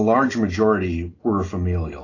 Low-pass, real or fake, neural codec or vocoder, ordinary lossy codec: 7.2 kHz; real; none; AAC, 32 kbps